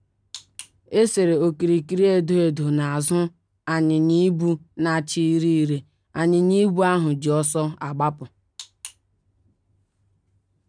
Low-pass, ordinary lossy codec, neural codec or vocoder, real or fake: 9.9 kHz; none; none; real